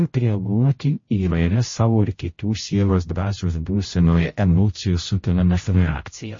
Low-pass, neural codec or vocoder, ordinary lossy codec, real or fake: 7.2 kHz; codec, 16 kHz, 0.5 kbps, X-Codec, HuBERT features, trained on general audio; MP3, 32 kbps; fake